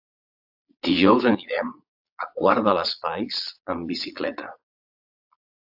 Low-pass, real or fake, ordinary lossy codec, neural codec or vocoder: 5.4 kHz; fake; AAC, 48 kbps; vocoder, 22.05 kHz, 80 mel bands, Vocos